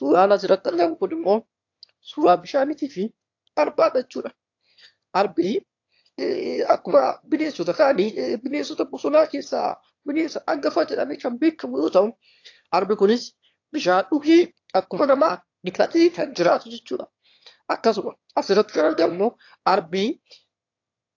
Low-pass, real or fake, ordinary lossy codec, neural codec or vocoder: 7.2 kHz; fake; AAC, 48 kbps; autoencoder, 22.05 kHz, a latent of 192 numbers a frame, VITS, trained on one speaker